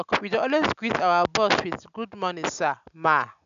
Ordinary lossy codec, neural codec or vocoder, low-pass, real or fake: MP3, 96 kbps; none; 7.2 kHz; real